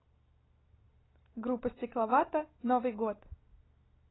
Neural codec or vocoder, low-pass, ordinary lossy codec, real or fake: none; 7.2 kHz; AAC, 16 kbps; real